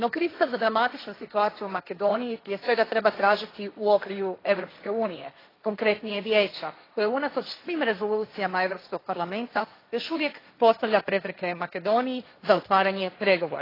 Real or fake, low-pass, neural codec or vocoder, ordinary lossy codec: fake; 5.4 kHz; codec, 16 kHz, 1.1 kbps, Voila-Tokenizer; AAC, 24 kbps